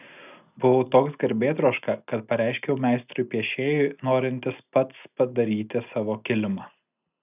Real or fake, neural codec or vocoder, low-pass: real; none; 3.6 kHz